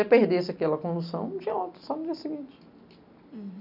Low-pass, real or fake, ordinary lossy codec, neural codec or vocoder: 5.4 kHz; real; none; none